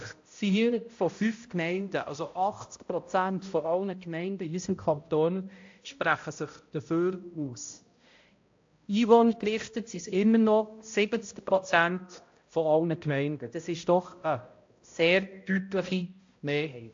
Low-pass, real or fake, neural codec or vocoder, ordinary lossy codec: 7.2 kHz; fake; codec, 16 kHz, 0.5 kbps, X-Codec, HuBERT features, trained on balanced general audio; AAC, 48 kbps